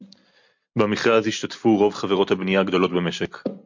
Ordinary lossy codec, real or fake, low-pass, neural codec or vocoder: MP3, 48 kbps; real; 7.2 kHz; none